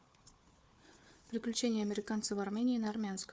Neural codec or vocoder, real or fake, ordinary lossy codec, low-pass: codec, 16 kHz, 4 kbps, FunCodec, trained on Chinese and English, 50 frames a second; fake; none; none